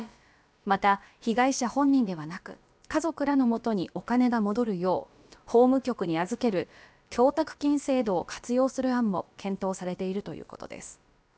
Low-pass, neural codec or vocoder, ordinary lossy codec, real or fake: none; codec, 16 kHz, about 1 kbps, DyCAST, with the encoder's durations; none; fake